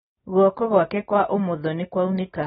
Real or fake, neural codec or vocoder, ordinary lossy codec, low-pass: real; none; AAC, 16 kbps; 19.8 kHz